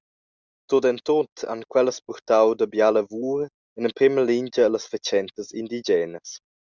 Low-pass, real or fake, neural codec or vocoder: 7.2 kHz; real; none